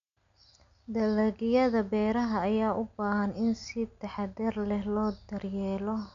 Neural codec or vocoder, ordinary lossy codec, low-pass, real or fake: none; none; 7.2 kHz; real